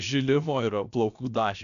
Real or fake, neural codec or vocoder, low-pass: fake; codec, 16 kHz, about 1 kbps, DyCAST, with the encoder's durations; 7.2 kHz